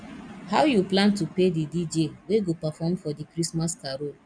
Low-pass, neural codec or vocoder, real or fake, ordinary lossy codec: 9.9 kHz; none; real; none